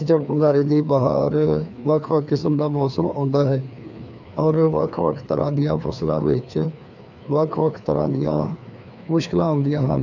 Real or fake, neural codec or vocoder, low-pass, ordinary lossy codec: fake; codec, 16 kHz, 2 kbps, FreqCodec, larger model; 7.2 kHz; none